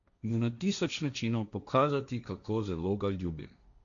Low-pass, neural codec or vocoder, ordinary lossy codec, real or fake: 7.2 kHz; codec, 16 kHz, 1.1 kbps, Voila-Tokenizer; none; fake